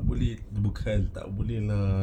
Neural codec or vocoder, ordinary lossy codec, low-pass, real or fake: none; none; 19.8 kHz; real